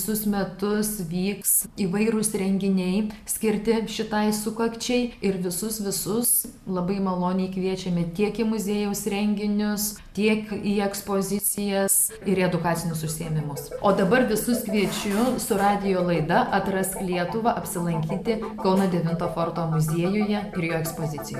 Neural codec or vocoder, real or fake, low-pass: none; real; 14.4 kHz